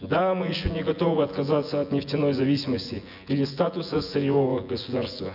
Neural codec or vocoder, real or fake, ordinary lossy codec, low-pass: vocoder, 24 kHz, 100 mel bands, Vocos; fake; none; 5.4 kHz